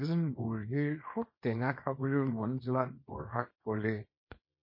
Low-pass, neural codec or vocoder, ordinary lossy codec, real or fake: 5.4 kHz; codec, 24 kHz, 0.9 kbps, WavTokenizer, small release; MP3, 24 kbps; fake